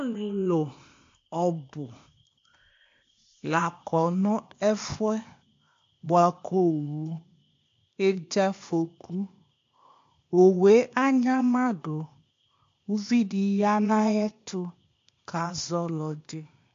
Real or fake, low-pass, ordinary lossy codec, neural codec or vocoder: fake; 7.2 kHz; MP3, 48 kbps; codec, 16 kHz, 0.8 kbps, ZipCodec